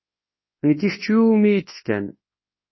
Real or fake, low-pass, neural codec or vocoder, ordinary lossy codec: fake; 7.2 kHz; codec, 24 kHz, 0.9 kbps, WavTokenizer, large speech release; MP3, 24 kbps